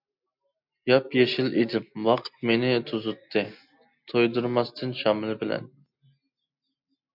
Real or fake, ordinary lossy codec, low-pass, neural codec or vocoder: real; MP3, 48 kbps; 5.4 kHz; none